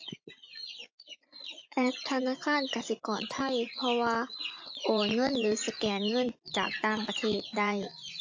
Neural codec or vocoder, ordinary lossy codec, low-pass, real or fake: vocoder, 24 kHz, 100 mel bands, Vocos; AAC, 48 kbps; 7.2 kHz; fake